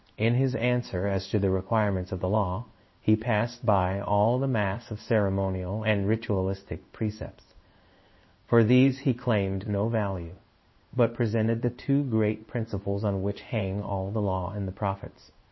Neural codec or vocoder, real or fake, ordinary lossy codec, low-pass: codec, 16 kHz in and 24 kHz out, 1 kbps, XY-Tokenizer; fake; MP3, 24 kbps; 7.2 kHz